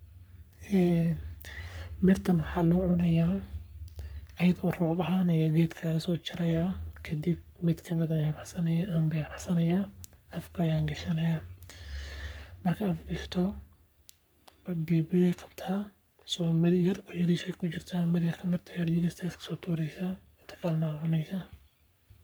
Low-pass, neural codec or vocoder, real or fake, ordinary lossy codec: none; codec, 44.1 kHz, 3.4 kbps, Pupu-Codec; fake; none